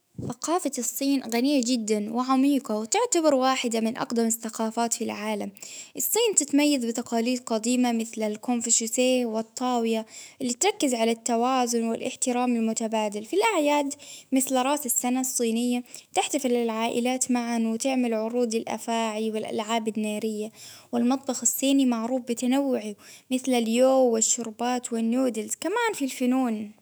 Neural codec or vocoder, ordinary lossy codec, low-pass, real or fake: autoencoder, 48 kHz, 128 numbers a frame, DAC-VAE, trained on Japanese speech; none; none; fake